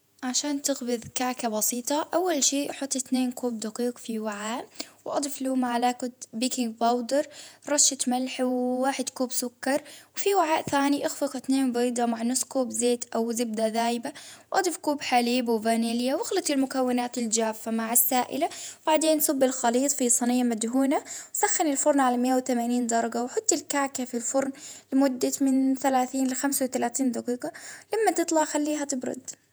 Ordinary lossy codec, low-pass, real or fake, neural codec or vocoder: none; none; fake; vocoder, 48 kHz, 128 mel bands, Vocos